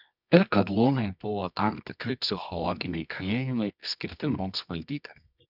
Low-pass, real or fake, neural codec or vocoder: 5.4 kHz; fake; codec, 24 kHz, 0.9 kbps, WavTokenizer, medium music audio release